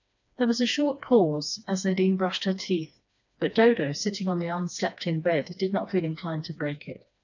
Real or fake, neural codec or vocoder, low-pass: fake; codec, 16 kHz, 2 kbps, FreqCodec, smaller model; 7.2 kHz